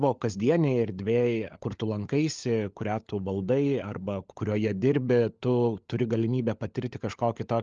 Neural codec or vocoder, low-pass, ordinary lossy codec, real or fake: codec, 16 kHz, 16 kbps, FunCodec, trained on LibriTTS, 50 frames a second; 7.2 kHz; Opus, 24 kbps; fake